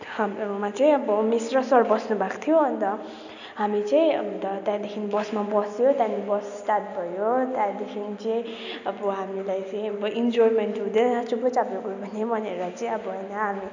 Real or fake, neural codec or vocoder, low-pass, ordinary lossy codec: real; none; 7.2 kHz; none